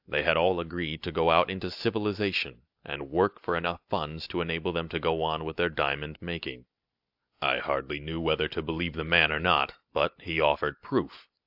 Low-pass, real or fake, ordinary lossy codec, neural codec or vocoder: 5.4 kHz; real; AAC, 48 kbps; none